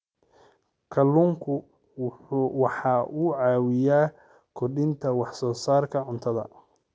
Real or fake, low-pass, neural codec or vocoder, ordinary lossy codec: real; none; none; none